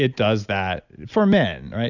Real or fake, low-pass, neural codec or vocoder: real; 7.2 kHz; none